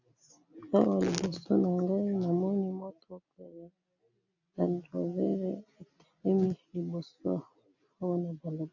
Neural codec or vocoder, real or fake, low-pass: none; real; 7.2 kHz